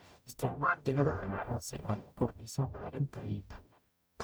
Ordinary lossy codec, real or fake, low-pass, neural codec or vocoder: none; fake; none; codec, 44.1 kHz, 0.9 kbps, DAC